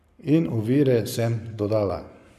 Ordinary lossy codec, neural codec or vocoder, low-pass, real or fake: none; codec, 44.1 kHz, 7.8 kbps, Pupu-Codec; 14.4 kHz; fake